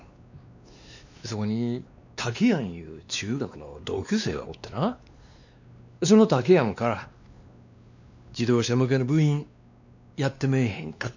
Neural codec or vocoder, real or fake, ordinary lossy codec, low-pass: codec, 16 kHz, 2 kbps, X-Codec, WavLM features, trained on Multilingual LibriSpeech; fake; none; 7.2 kHz